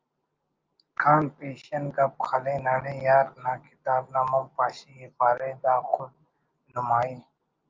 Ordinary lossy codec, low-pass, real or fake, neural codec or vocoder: Opus, 32 kbps; 7.2 kHz; real; none